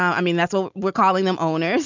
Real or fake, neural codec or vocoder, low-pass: real; none; 7.2 kHz